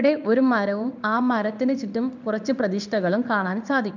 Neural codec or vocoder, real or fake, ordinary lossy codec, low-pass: codec, 16 kHz in and 24 kHz out, 1 kbps, XY-Tokenizer; fake; none; 7.2 kHz